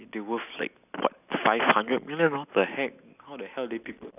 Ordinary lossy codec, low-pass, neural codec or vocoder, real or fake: none; 3.6 kHz; none; real